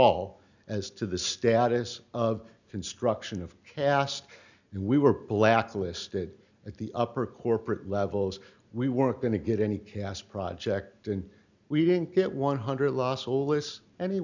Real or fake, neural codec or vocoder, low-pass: real; none; 7.2 kHz